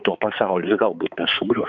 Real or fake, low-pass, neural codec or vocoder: fake; 7.2 kHz; codec, 16 kHz, 4 kbps, X-Codec, HuBERT features, trained on balanced general audio